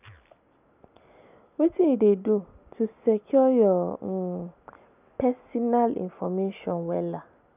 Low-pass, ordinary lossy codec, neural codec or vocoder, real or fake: 3.6 kHz; none; none; real